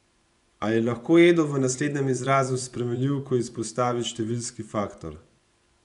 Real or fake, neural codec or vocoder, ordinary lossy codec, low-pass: fake; vocoder, 24 kHz, 100 mel bands, Vocos; none; 10.8 kHz